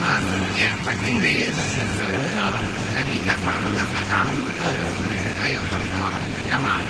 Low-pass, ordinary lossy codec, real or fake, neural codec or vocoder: 10.8 kHz; Opus, 16 kbps; fake; codec, 24 kHz, 0.9 kbps, WavTokenizer, small release